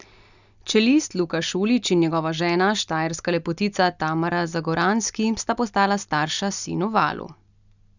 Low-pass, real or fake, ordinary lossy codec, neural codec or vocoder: 7.2 kHz; real; none; none